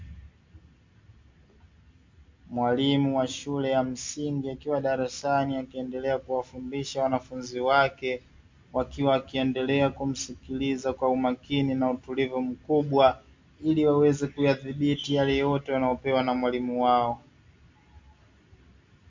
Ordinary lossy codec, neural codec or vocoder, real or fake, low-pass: MP3, 48 kbps; none; real; 7.2 kHz